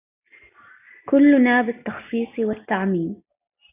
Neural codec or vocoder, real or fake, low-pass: none; real; 3.6 kHz